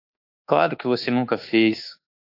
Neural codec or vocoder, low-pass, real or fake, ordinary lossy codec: codec, 24 kHz, 1.2 kbps, DualCodec; 5.4 kHz; fake; AAC, 32 kbps